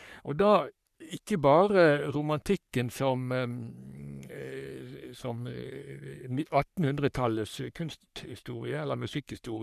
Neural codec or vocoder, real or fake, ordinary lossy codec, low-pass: codec, 44.1 kHz, 3.4 kbps, Pupu-Codec; fake; none; 14.4 kHz